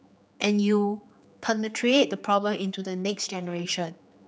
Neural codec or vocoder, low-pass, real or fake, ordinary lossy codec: codec, 16 kHz, 4 kbps, X-Codec, HuBERT features, trained on general audio; none; fake; none